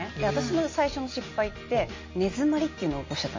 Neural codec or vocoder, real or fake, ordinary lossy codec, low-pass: none; real; MP3, 32 kbps; 7.2 kHz